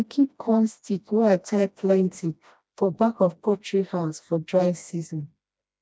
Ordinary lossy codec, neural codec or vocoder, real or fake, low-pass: none; codec, 16 kHz, 1 kbps, FreqCodec, smaller model; fake; none